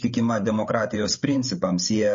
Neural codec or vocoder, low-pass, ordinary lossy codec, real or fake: codec, 16 kHz, 8 kbps, FreqCodec, larger model; 7.2 kHz; MP3, 32 kbps; fake